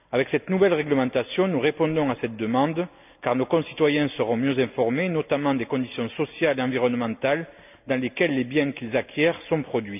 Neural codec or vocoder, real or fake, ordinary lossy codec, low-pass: none; real; none; 3.6 kHz